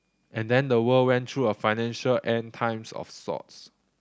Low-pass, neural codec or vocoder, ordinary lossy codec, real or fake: none; none; none; real